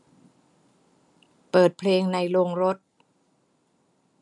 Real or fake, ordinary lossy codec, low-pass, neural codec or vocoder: real; none; 10.8 kHz; none